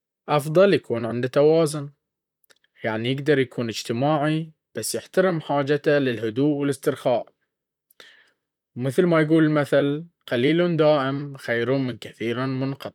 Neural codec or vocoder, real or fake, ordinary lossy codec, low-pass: vocoder, 44.1 kHz, 128 mel bands, Pupu-Vocoder; fake; none; 19.8 kHz